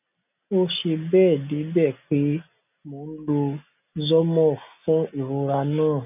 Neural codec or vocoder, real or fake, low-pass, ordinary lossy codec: none; real; 3.6 kHz; none